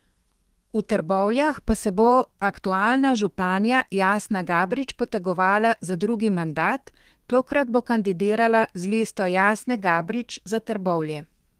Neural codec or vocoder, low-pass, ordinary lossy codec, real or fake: codec, 32 kHz, 1.9 kbps, SNAC; 14.4 kHz; Opus, 24 kbps; fake